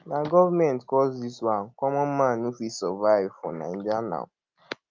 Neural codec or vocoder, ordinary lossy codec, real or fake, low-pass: none; Opus, 24 kbps; real; 7.2 kHz